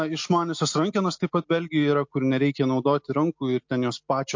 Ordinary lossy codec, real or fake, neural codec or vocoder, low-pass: MP3, 48 kbps; real; none; 7.2 kHz